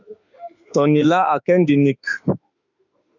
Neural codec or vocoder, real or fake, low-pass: autoencoder, 48 kHz, 32 numbers a frame, DAC-VAE, trained on Japanese speech; fake; 7.2 kHz